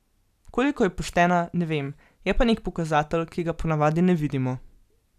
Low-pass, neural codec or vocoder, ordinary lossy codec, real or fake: 14.4 kHz; none; none; real